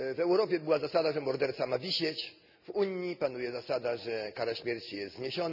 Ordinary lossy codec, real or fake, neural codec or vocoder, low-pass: none; real; none; 5.4 kHz